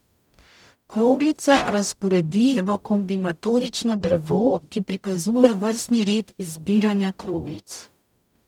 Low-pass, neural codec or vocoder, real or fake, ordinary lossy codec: 19.8 kHz; codec, 44.1 kHz, 0.9 kbps, DAC; fake; none